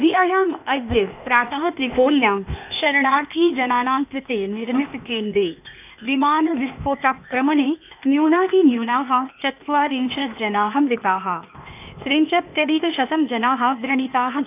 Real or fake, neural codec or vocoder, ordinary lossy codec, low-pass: fake; codec, 16 kHz, 0.8 kbps, ZipCodec; none; 3.6 kHz